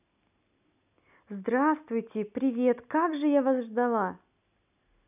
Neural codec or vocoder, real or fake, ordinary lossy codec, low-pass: none; real; none; 3.6 kHz